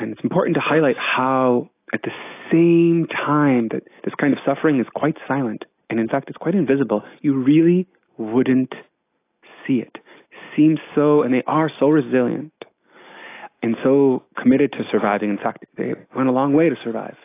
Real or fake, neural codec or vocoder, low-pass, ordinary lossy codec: real; none; 3.6 kHz; AAC, 24 kbps